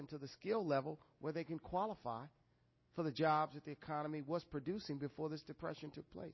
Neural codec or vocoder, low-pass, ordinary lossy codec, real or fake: none; 7.2 kHz; MP3, 24 kbps; real